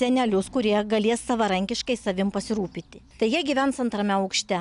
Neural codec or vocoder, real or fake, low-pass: none; real; 10.8 kHz